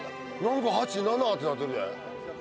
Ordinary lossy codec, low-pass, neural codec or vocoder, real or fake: none; none; none; real